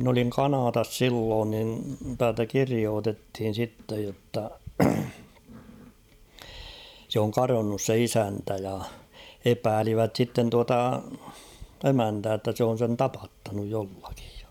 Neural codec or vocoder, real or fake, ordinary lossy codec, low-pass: vocoder, 44.1 kHz, 128 mel bands every 512 samples, BigVGAN v2; fake; none; 19.8 kHz